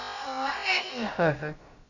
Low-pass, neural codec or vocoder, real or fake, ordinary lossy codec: 7.2 kHz; codec, 16 kHz, about 1 kbps, DyCAST, with the encoder's durations; fake; none